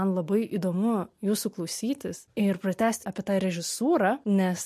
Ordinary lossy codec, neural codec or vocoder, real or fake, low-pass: MP3, 64 kbps; none; real; 14.4 kHz